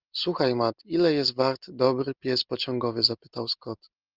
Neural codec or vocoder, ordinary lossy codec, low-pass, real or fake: none; Opus, 24 kbps; 5.4 kHz; real